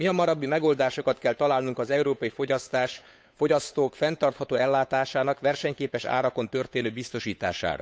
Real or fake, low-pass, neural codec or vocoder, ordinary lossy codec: fake; none; codec, 16 kHz, 8 kbps, FunCodec, trained on Chinese and English, 25 frames a second; none